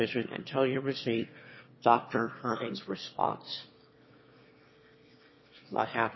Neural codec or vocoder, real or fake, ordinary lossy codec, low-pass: autoencoder, 22.05 kHz, a latent of 192 numbers a frame, VITS, trained on one speaker; fake; MP3, 24 kbps; 7.2 kHz